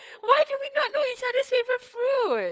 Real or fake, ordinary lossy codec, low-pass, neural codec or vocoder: fake; none; none; codec, 16 kHz, 4 kbps, FunCodec, trained on LibriTTS, 50 frames a second